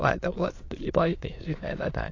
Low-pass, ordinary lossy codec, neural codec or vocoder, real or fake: 7.2 kHz; AAC, 32 kbps; autoencoder, 22.05 kHz, a latent of 192 numbers a frame, VITS, trained on many speakers; fake